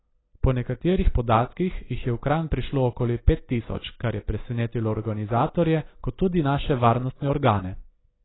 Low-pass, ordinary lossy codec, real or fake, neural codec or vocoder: 7.2 kHz; AAC, 16 kbps; real; none